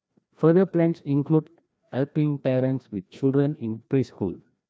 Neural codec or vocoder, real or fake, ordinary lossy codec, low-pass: codec, 16 kHz, 1 kbps, FreqCodec, larger model; fake; none; none